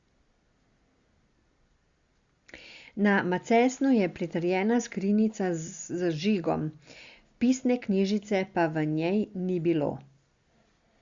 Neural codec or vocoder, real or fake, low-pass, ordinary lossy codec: none; real; 7.2 kHz; Opus, 64 kbps